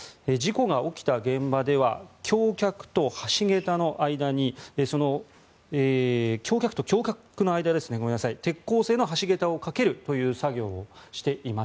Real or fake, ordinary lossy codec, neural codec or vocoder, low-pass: real; none; none; none